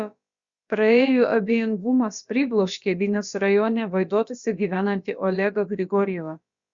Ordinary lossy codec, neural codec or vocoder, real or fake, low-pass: Opus, 64 kbps; codec, 16 kHz, about 1 kbps, DyCAST, with the encoder's durations; fake; 7.2 kHz